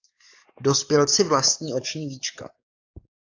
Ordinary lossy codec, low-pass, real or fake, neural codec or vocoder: AAC, 48 kbps; 7.2 kHz; fake; codec, 44.1 kHz, 7.8 kbps, DAC